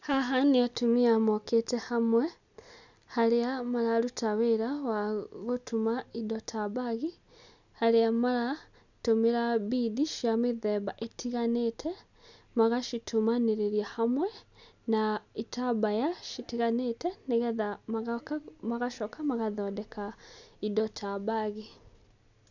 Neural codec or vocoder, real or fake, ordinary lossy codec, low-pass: none; real; none; 7.2 kHz